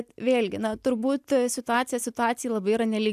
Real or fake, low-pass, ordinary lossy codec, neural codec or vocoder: real; 14.4 kHz; AAC, 96 kbps; none